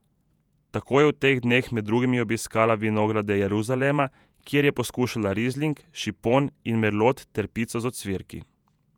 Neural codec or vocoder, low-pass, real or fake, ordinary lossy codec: vocoder, 48 kHz, 128 mel bands, Vocos; 19.8 kHz; fake; none